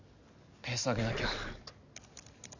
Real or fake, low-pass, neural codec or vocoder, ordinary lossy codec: real; 7.2 kHz; none; none